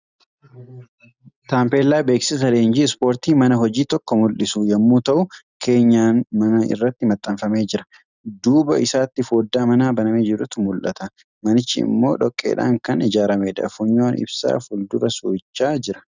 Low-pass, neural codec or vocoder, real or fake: 7.2 kHz; none; real